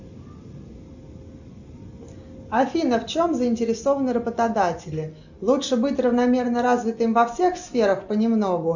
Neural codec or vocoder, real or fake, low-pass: none; real; 7.2 kHz